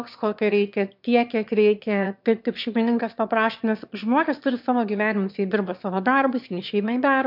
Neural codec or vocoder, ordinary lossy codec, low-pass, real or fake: autoencoder, 22.05 kHz, a latent of 192 numbers a frame, VITS, trained on one speaker; MP3, 48 kbps; 5.4 kHz; fake